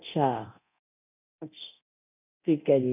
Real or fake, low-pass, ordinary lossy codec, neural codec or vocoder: fake; 3.6 kHz; none; codec, 24 kHz, 0.9 kbps, DualCodec